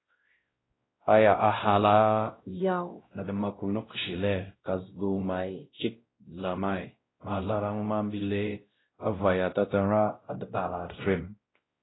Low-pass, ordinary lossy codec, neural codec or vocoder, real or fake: 7.2 kHz; AAC, 16 kbps; codec, 16 kHz, 0.5 kbps, X-Codec, WavLM features, trained on Multilingual LibriSpeech; fake